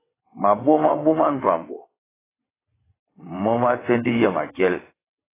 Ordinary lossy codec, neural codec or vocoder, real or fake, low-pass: AAC, 16 kbps; vocoder, 22.05 kHz, 80 mel bands, WaveNeXt; fake; 3.6 kHz